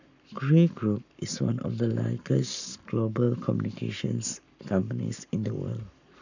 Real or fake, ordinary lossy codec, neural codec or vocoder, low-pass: fake; none; codec, 44.1 kHz, 7.8 kbps, Pupu-Codec; 7.2 kHz